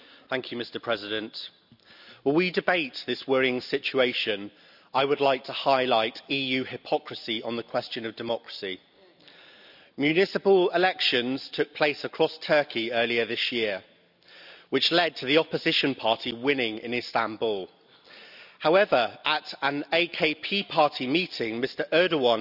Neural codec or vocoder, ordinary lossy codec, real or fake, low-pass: none; none; real; 5.4 kHz